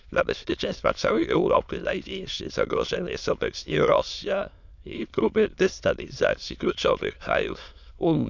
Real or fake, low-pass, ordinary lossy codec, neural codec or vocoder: fake; 7.2 kHz; none; autoencoder, 22.05 kHz, a latent of 192 numbers a frame, VITS, trained on many speakers